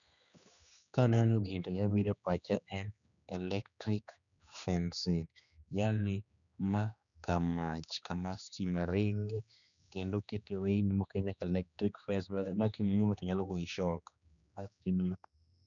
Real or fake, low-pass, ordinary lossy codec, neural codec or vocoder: fake; 7.2 kHz; none; codec, 16 kHz, 2 kbps, X-Codec, HuBERT features, trained on general audio